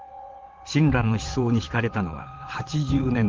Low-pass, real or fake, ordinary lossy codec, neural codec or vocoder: 7.2 kHz; fake; Opus, 16 kbps; vocoder, 22.05 kHz, 80 mel bands, WaveNeXt